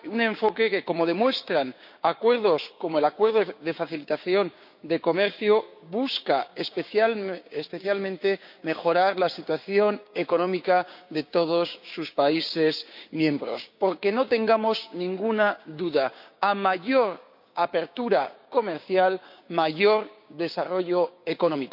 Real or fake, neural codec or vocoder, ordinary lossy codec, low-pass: fake; codec, 16 kHz, 6 kbps, DAC; none; 5.4 kHz